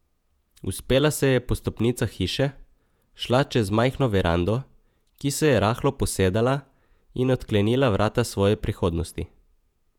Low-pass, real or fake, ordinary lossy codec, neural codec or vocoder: 19.8 kHz; fake; none; vocoder, 48 kHz, 128 mel bands, Vocos